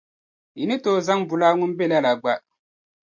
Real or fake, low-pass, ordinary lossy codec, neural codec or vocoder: real; 7.2 kHz; MP3, 48 kbps; none